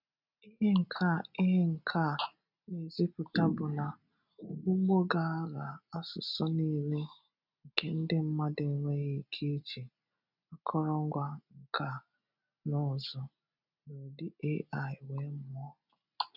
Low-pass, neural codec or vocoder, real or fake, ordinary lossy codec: 5.4 kHz; none; real; none